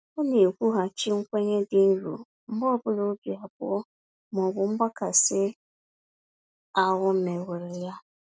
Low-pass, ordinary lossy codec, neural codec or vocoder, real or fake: none; none; none; real